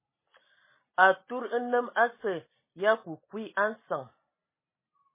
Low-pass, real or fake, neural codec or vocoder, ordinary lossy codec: 3.6 kHz; real; none; MP3, 16 kbps